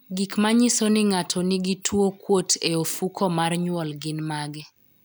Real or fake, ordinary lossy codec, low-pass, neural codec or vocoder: real; none; none; none